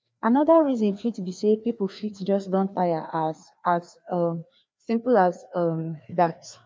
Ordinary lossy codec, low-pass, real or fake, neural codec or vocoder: none; none; fake; codec, 16 kHz, 2 kbps, FreqCodec, larger model